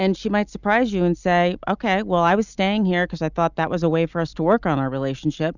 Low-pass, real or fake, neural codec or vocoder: 7.2 kHz; real; none